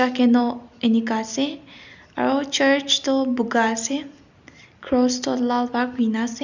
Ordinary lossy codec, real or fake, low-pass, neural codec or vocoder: none; real; 7.2 kHz; none